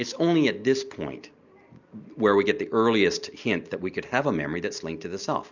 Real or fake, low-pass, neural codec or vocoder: real; 7.2 kHz; none